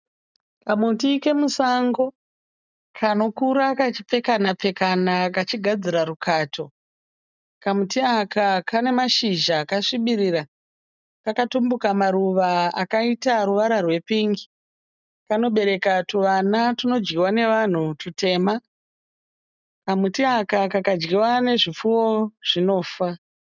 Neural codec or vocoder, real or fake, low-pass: none; real; 7.2 kHz